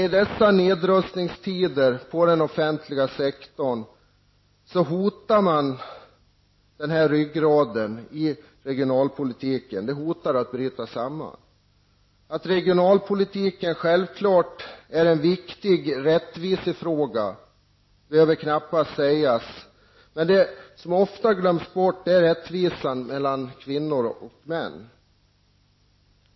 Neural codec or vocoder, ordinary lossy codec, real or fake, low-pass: none; MP3, 24 kbps; real; 7.2 kHz